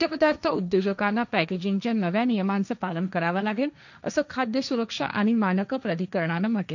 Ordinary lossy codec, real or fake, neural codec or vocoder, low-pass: none; fake; codec, 16 kHz, 1.1 kbps, Voila-Tokenizer; 7.2 kHz